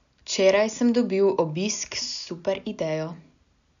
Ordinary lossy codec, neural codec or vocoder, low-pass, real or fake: none; none; 7.2 kHz; real